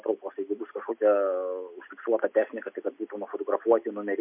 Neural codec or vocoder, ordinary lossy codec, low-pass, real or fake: none; MP3, 32 kbps; 3.6 kHz; real